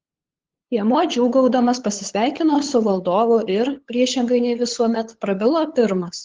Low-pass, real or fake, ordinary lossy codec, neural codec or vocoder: 7.2 kHz; fake; Opus, 16 kbps; codec, 16 kHz, 8 kbps, FunCodec, trained on LibriTTS, 25 frames a second